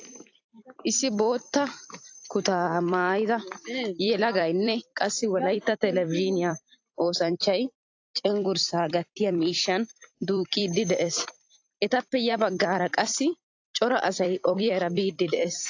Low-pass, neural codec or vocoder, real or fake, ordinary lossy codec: 7.2 kHz; vocoder, 44.1 kHz, 128 mel bands every 256 samples, BigVGAN v2; fake; AAC, 48 kbps